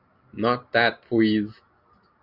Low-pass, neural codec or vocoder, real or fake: 5.4 kHz; none; real